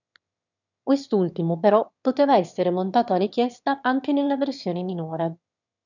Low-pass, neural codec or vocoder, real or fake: 7.2 kHz; autoencoder, 22.05 kHz, a latent of 192 numbers a frame, VITS, trained on one speaker; fake